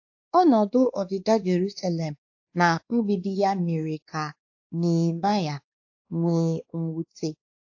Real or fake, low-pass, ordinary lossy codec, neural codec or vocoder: fake; 7.2 kHz; AAC, 48 kbps; codec, 16 kHz, 2 kbps, X-Codec, WavLM features, trained on Multilingual LibriSpeech